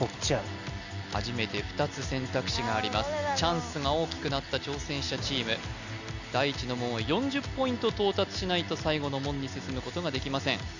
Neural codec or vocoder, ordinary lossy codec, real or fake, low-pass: none; none; real; 7.2 kHz